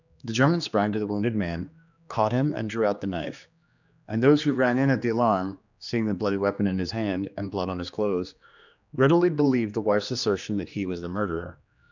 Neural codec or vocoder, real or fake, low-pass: codec, 16 kHz, 2 kbps, X-Codec, HuBERT features, trained on general audio; fake; 7.2 kHz